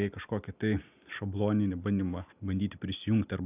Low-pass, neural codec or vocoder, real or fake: 3.6 kHz; none; real